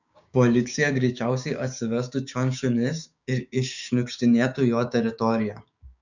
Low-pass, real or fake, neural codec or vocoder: 7.2 kHz; fake; codec, 16 kHz, 6 kbps, DAC